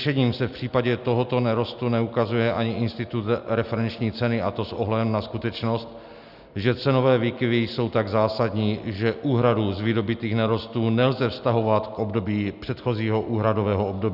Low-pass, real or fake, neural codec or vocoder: 5.4 kHz; real; none